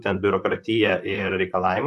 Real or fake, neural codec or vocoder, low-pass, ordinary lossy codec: fake; vocoder, 44.1 kHz, 128 mel bands, Pupu-Vocoder; 14.4 kHz; AAC, 96 kbps